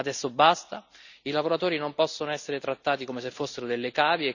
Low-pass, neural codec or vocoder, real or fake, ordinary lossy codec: 7.2 kHz; none; real; none